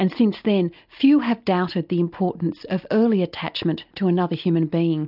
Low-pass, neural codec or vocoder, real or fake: 5.4 kHz; none; real